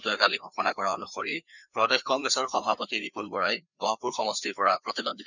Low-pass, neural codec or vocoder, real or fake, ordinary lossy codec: 7.2 kHz; codec, 16 kHz, 2 kbps, FreqCodec, larger model; fake; none